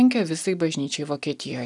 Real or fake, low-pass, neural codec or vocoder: real; 10.8 kHz; none